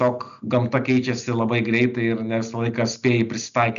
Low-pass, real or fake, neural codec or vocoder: 7.2 kHz; real; none